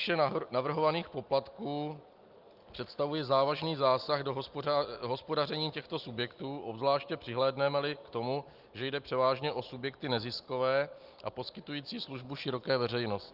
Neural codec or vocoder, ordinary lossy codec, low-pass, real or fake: none; Opus, 24 kbps; 5.4 kHz; real